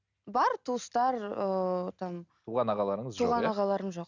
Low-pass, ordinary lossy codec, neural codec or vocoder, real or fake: 7.2 kHz; none; none; real